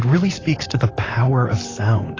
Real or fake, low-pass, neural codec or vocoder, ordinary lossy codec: fake; 7.2 kHz; vocoder, 44.1 kHz, 128 mel bands, Pupu-Vocoder; AAC, 32 kbps